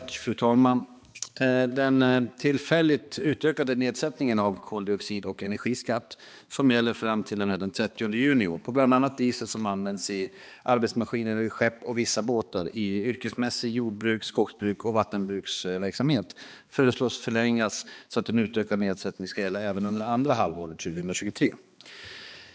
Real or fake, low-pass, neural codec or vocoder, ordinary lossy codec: fake; none; codec, 16 kHz, 2 kbps, X-Codec, HuBERT features, trained on balanced general audio; none